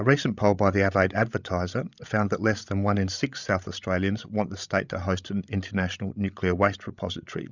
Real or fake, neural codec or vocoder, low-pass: fake; codec, 16 kHz, 16 kbps, FreqCodec, larger model; 7.2 kHz